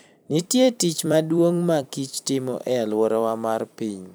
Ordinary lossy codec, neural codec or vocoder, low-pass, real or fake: none; vocoder, 44.1 kHz, 128 mel bands every 256 samples, BigVGAN v2; none; fake